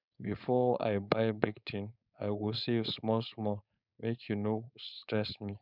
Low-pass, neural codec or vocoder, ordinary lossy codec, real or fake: 5.4 kHz; codec, 16 kHz, 4.8 kbps, FACodec; none; fake